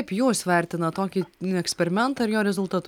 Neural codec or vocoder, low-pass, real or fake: none; 19.8 kHz; real